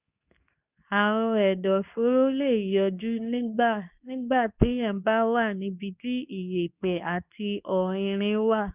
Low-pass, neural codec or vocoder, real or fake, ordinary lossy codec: 3.6 kHz; codec, 24 kHz, 0.9 kbps, WavTokenizer, medium speech release version 2; fake; none